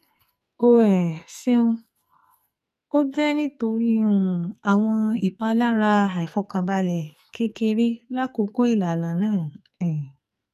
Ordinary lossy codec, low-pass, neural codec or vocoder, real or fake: none; 14.4 kHz; codec, 44.1 kHz, 2.6 kbps, SNAC; fake